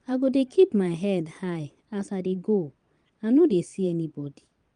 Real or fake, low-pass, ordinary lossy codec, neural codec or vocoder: fake; 9.9 kHz; Opus, 32 kbps; vocoder, 22.05 kHz, 80 mel bands, Vocos